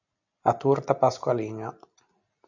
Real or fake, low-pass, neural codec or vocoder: real; 7.2 kHz; none